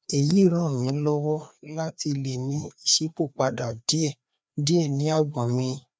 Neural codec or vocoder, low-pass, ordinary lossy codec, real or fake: codec, 16 kHz, 2 kbps, FreqCodec, larger model; none; none; fake